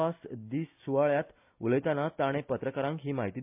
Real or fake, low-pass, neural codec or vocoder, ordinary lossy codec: real; 3.6 kHz; none; MP3, 32 kbps